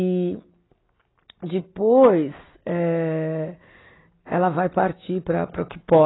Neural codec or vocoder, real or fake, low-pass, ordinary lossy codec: none; real; 7.2 kHz; AAC, 16 kbps